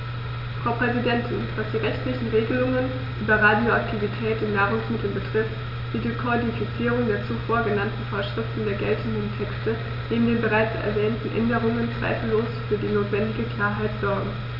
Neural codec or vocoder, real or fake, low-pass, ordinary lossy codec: none; real; 5.4 kHz; none